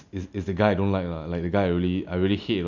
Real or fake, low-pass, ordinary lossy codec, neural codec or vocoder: real; 7.2 kHz; none; none